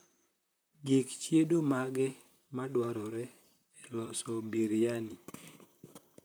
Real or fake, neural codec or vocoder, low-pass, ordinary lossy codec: fake; vocoder, 44.1 kHz, 128 mel bands, Pupu-Vocoder; none; none